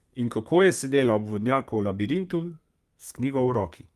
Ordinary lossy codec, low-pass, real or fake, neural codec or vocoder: Opus, 32 kbps; 14.4 kHz; fake; codec, 32 kHz, 1.9 kbps, SNAC